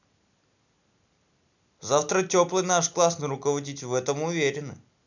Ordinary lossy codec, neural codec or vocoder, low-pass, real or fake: none; none; 7.2 kHz; real